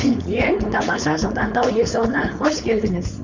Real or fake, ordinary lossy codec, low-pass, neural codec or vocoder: fake; none; 7.2 kHz; codec, 16 kHz, 4.8 kbps, FACodec